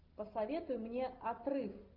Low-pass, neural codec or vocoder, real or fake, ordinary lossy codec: 5.4 kHz; none; real; Opus, 32 kbps